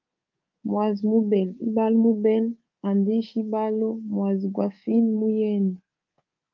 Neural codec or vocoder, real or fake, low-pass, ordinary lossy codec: codec, 24 kHz, 3.1 kbps, DualCodec; fake; 7.2 kHz; Opus, 24 kbps